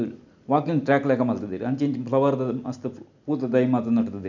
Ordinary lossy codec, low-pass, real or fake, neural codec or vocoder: none; 7.2 kHz; real; none